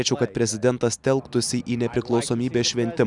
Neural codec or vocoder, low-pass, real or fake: none; 10.8 kHz; real